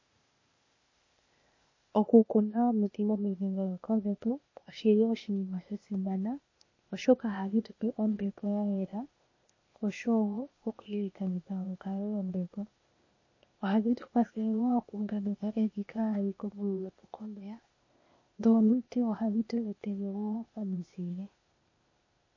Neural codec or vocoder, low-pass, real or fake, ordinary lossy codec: codec, 16 kHz, 0.8 kbps, ZipCodec; 7.2 kHz; fake; MP3, 32 kbps